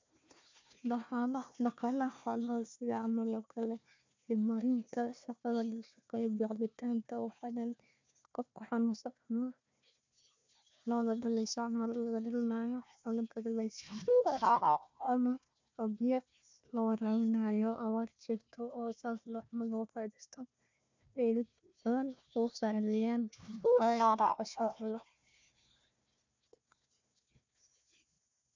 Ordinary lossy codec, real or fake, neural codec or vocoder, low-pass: MP3, 64 kbps; fake; codec, 16 kHz, 1 kbps, FunCodec, trained on Chinese and English, 50 frames a second; 7.2 kHz